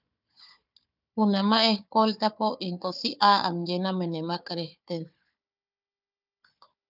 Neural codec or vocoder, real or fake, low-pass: codec, 16 kHz, 4 kbps, FunCodec, trained on Chinese and English, 50 frames a second; fake; 5.4 kHz